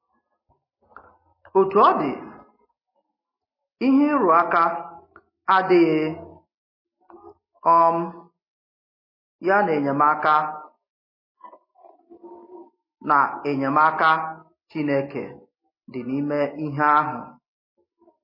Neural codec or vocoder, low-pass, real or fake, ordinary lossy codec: none; 5.4 kHz; real; MP3, 24 kbps